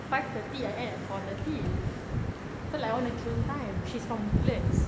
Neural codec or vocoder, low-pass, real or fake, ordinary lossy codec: none; none; real; none